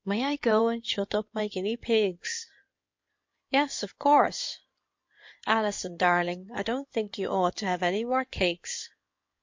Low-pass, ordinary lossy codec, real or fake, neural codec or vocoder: 7.2 kHz; MP3, 48 kbps; fake; codec, 16 kHz in and 24 kHz out, 2.2 kbps, FireRedTTS-2 codec